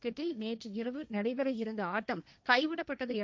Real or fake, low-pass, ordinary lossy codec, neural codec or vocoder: fake; none; none; codec, 16 kHz, 1.1 kbps, Voila-Tokenizer